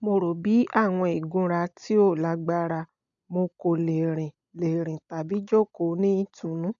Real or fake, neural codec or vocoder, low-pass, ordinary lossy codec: real; none; 7.2 kHz; none